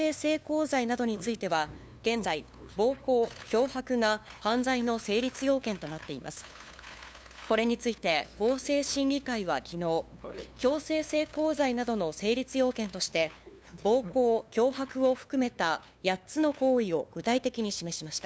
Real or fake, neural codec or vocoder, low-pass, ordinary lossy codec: fake; codec, 16 kHz, 2 kbps, FunCodec, trained on LibriTTS, 25 frames a second; none; none